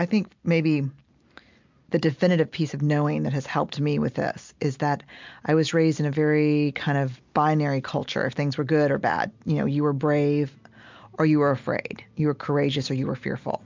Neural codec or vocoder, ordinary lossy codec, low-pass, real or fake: none; MP3, 64 kbps; 7.2 kHz; real